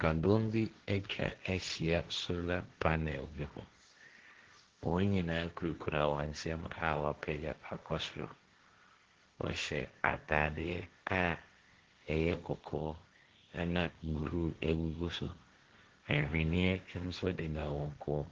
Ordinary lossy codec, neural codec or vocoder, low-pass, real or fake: Opus, 16 kbps; codec, 16 kHz, 1.1 kbps, Voila-Tokenizer; 7.2 kHz; fake